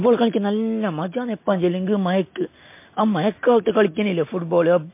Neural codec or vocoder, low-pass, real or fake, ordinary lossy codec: none; 3.6 kHz; real; MP3, 24 kbps